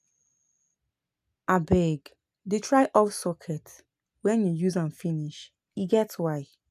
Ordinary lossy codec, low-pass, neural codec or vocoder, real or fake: none; 14.4 kHz; none; real